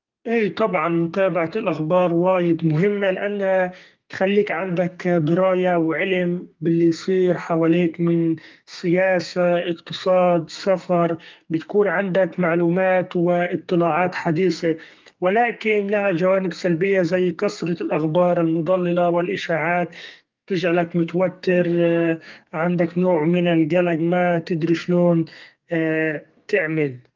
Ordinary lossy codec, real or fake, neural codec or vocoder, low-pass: Opus, 32 kbps; fake; codec, 32 kHz, 1.9 kbps, SNAC; 7.2 kHz